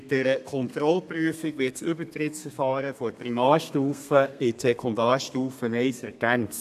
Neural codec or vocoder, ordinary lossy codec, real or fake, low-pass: codec, 32 kHz, 1.9 kbps, SNAC; none; fake; 14.4 kHz